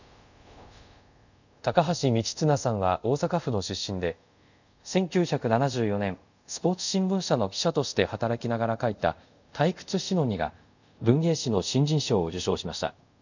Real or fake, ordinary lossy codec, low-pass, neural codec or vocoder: fake; none; 7.2 kHz; codec, 24 kHz, 0.5 kbps, DualCodec